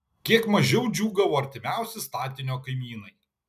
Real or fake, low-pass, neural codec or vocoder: real; 14.4 kHz; none